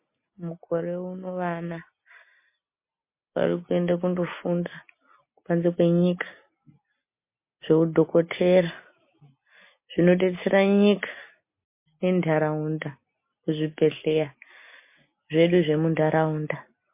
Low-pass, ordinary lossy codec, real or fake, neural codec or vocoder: 3.6 kHz; MP3, 24 kbps; real; none